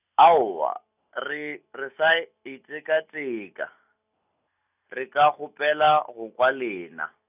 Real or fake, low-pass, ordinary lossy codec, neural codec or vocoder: real; 3.6 kHz; none; none